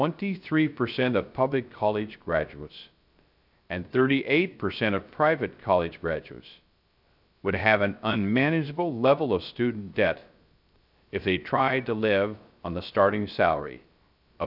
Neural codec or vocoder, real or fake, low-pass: codec, 16 kHz, 0.3 kbps, FocalCodec; fake; 5.4 kHz